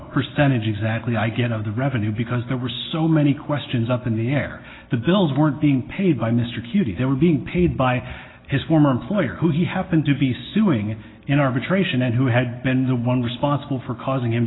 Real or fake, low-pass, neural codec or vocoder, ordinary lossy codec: real; 7.2 kHz; none; AAC, 16 kbps